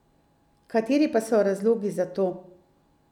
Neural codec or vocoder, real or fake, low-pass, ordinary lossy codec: none; real; 19.8 kHz; none